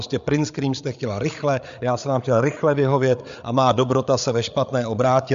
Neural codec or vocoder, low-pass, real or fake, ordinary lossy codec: codec, 16 kHz, 8 kbps, FreqCodec, larger model; 7.2 kHz; fake; MP3, 64 kbps